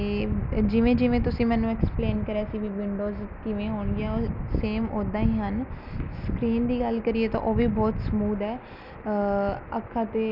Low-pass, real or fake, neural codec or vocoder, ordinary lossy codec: 5.4 kHz; real; none; none